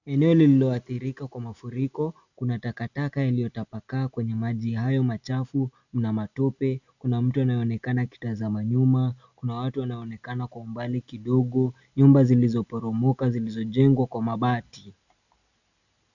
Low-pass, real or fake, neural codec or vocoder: 7.2 kHz; real; none